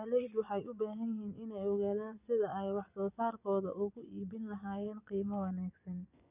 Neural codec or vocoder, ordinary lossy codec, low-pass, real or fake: vocoder, 24 kHz, 100 mel bands, Vocos; none; 3.6 kHz; fake